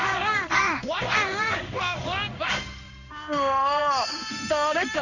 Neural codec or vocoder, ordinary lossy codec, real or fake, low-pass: codec, 16 kHz in and 24 kHz out, 1 kbps, XY-Tokenizer; none; fake; 7.2 kHz